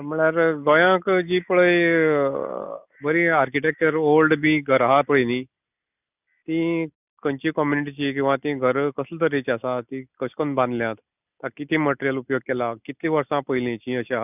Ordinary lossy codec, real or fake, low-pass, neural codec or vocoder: none; real; 3.6 kHz; none